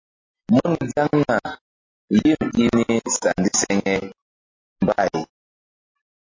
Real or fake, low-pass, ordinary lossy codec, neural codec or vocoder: real; 7.2 kHz; MP3, 32 kbps; none